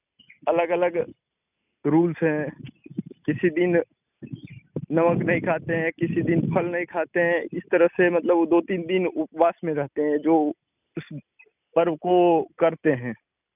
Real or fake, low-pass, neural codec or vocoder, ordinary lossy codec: real; 3.6 kHz; none; none